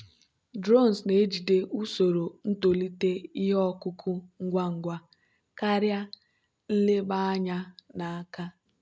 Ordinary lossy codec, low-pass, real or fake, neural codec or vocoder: none; none; real; none